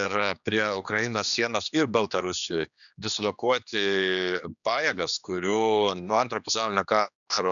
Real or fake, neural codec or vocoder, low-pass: fake; codec, 16 kHz, 2 kbps, X-Codec, HuBERT features, trained on general audio; 7.2 kHz